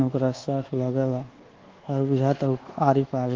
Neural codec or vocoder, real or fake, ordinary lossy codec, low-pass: codec, 24 kHz, 1.2 kbps, DualCodec; fake; Opus, 16 kbps; 7.2 kHz